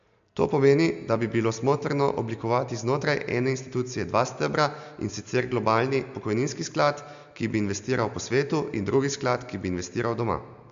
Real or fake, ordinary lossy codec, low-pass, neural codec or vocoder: real; MP3, 64 kbps; 7.2 kHz; none